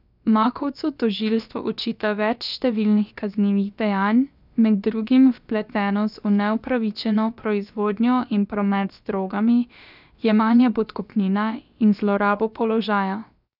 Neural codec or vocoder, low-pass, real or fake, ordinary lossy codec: codec, 16 kHz, about 1 kbps, DyCAST, with the encoder's durations; 5.4 kHz; fake; none